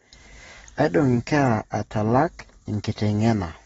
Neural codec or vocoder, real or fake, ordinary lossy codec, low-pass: none; real; AAC, 24 kbps; 14.4 kHz